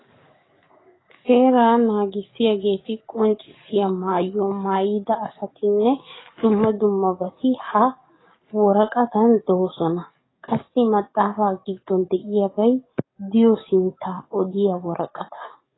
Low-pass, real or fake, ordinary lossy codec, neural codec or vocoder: 7.2 kHz; fake; AAC, 16 kbps; codec, 44.1 kHz, 7.8 kbps, DAC